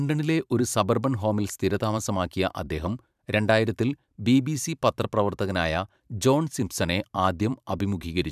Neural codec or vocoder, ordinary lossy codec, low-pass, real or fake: vocoder, 44.1 kHz, 128 mel bands every 512 samples, BigVGAN v2; none; 14.4 kHz; fake